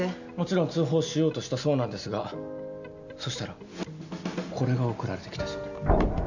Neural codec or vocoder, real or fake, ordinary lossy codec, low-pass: none; real; none; 7.2 kHz